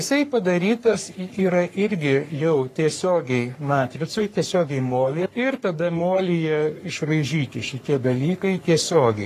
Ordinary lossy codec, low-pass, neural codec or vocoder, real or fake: AAC, 48 kbps; 14.4 kHz; codec, 44.1 kHz, 3.4 kbps, Pupu-Codec; fake